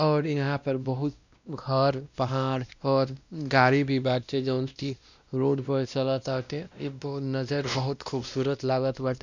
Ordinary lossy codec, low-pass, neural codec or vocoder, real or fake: none; 7.2 kHz; codec, 16 kHz, 1 kbps, X-Codec, WavLM features, trained on Multilingual LibriSpeech; fake